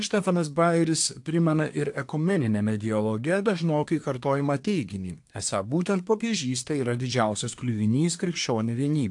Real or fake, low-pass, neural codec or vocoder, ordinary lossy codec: fake; 10.8 kHz; codec, 24 kHz, 1 kbps, SNAC; AAC, 64 kbps